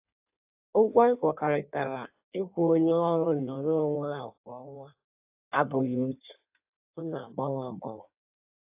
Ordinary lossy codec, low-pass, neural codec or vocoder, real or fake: AAC, 32 kbps; 3.6 kHz; codec, 16 kHz in and 24 kHz out, 1.1 kbps, FireRedTTS-2 codec; fake